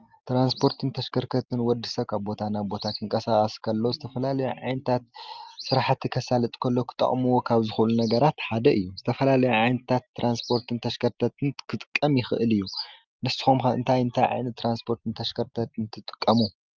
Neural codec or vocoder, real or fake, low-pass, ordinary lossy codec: none; real; 7.2 kHz; Opus, 32 kbps